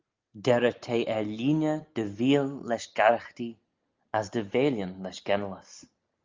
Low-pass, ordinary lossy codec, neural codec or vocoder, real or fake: 7.2 kHz; Opus, 24 kbps; none; real